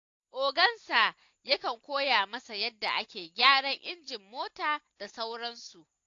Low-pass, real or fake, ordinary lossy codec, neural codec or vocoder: 7.2 kHz; real; AAC, 48 kbps; none